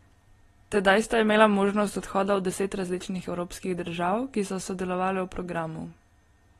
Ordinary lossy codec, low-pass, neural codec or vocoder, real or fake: AAC, 32 kbps; 19.8 kHz; none; real